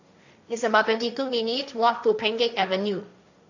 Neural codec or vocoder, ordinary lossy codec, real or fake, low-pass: codec, 16 kHz, 1.1 kbps, Voila-Tokenizer; none; fake; 7.2 kHz